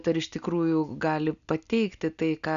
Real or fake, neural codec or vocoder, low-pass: real; none; 7.2 kHz